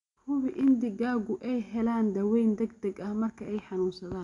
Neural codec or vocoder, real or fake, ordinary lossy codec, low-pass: none; real; none; 10.8 kHz